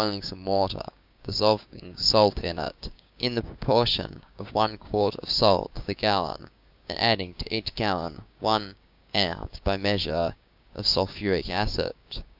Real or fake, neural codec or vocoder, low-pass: fake; codec, 16 kHz, 6 kbps, DAC; 5.4 kHz